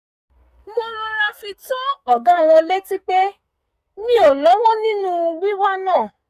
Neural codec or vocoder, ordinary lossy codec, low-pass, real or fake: codec, 44.1 kHz, 2.6 kbps, SNAC; none; 14.4 kHz; fake